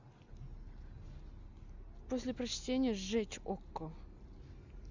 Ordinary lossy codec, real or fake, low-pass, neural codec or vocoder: Opus, 64 kbps; real; 7.2 kHz; none